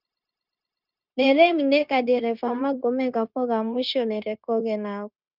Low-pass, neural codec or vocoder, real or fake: 5.4 kHz; codec, 16 kHz, 0.9 kbps, LongCat-Audio-Codec; fake